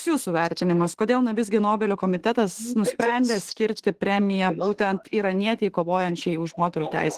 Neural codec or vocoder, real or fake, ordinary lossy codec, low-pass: autoencoder, 48 kHz, 32 numbers a frame, DAC-VAE, trained on Japanese speech; fake; Opus, 16 kbps; 14.4 kHz